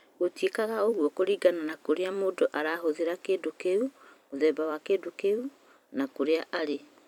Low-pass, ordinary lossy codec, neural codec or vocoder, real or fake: 19.8 kHz; none; vocoder, 44.1 kHz, 128 mel bands every 256 samples, BigVGAN v2; fake